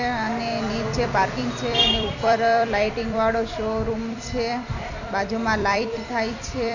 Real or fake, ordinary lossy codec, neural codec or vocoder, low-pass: fake; none; vocoder, 44.1 kHz, 128 mel bands every 256 samples, BigVGAN v2; 7.2 kHz